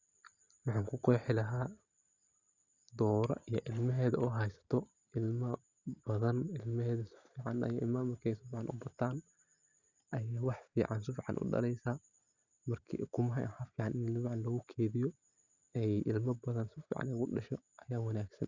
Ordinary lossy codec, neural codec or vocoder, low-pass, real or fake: Opus, 64 kbps; none; 7.2 kHz; real